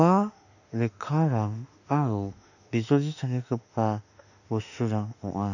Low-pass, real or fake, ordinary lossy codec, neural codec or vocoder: 7.2 kHz; fake; none; autoencoder, 48 kHz, 32 numbers a frame, DAC-VAE, trained on Japanese speech